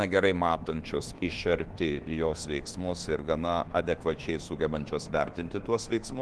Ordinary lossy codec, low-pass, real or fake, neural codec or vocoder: Opus, 16 kbps; 10.8 kHz; fake; codec, 24 kHz, 1.2 kbps, DualCodec